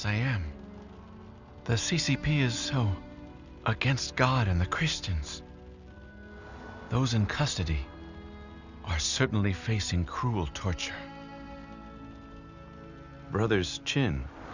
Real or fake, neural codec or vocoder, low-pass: real; none; 7.2 kHz